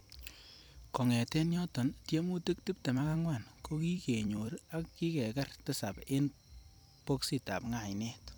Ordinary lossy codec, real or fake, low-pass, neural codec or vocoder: none; fake; none; vocoder, 44.1 kHz, 128 mel bands every 256 samples, BigVGAN v2